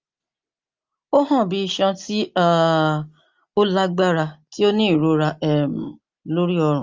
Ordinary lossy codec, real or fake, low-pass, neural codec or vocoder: Opus, 32 kbps; real; 7.2 kHz; none